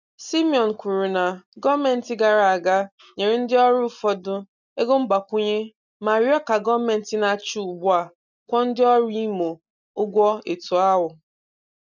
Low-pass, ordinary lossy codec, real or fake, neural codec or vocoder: 7.2 kHz; none; real; none